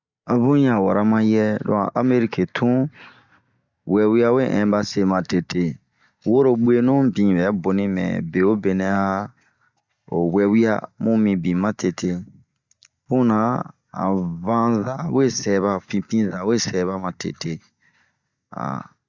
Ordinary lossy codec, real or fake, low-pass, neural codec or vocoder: Opus, 64 kbps; real; 7.2 kHz; none